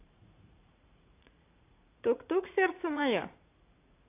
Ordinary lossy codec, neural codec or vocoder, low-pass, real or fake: AAC, 24 kbps; vocoder, 44.1 kHz, 80 mel bands, Vocos; 3.6 kHz; fake